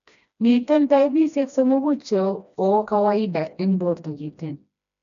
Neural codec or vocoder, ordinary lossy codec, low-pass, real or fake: codec, 16 kHz, 1 kbps, FreqCodec, smaller model; none; 7.2 kHz; fake